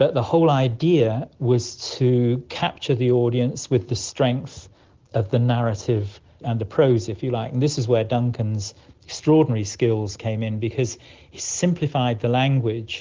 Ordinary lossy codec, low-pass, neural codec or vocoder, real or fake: Opus, 16 kbps; 7.2 kHz; none; real